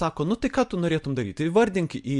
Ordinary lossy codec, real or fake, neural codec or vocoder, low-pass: MP3, 64 kbps; real; none; 10.8 kHz